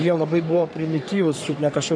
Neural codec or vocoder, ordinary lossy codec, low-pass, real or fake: codec, 44.1 kHz, 3.4 kbps, Pupu-Codec; MP3, 96 kbps; 9.9 kHz; fake